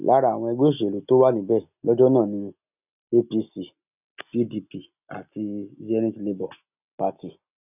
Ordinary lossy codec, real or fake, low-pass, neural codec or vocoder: none; real; 3.6 kHz; none